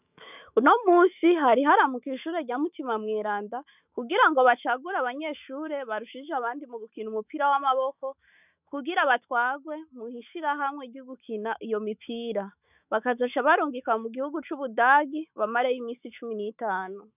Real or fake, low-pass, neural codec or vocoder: fake; 3.6 kHz; autoencoder, 48 kHz, 128 numbers a frame, DAC-VAE, trained on Japanese speech